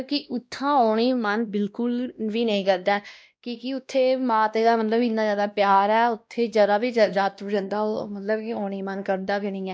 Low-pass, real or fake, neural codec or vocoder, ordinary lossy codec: none; fake; codec, 16 kHz, 1 kbps, X-Codec, WavLM features, trained on Multilingual LibriSpeech; none